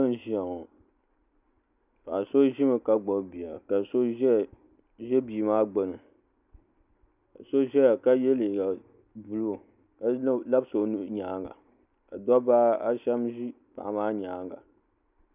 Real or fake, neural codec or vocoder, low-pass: real; none; 3.6 kHz